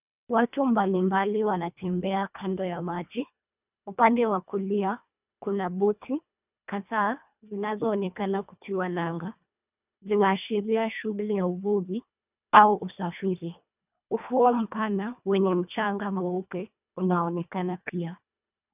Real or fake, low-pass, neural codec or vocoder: fake; 3.6 kHz; codec, 24 kHz, 1.5 kbps, HILCodec